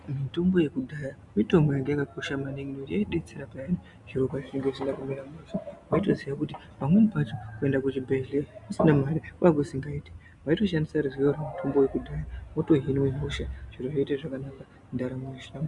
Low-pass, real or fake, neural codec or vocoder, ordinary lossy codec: 10.8 kHz; real; none; Opus, 64 kbps